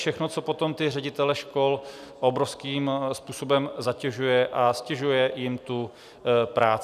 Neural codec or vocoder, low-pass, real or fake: none; 14.4 kHz; real